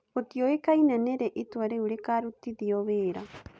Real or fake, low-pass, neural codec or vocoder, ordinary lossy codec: real; none; none; none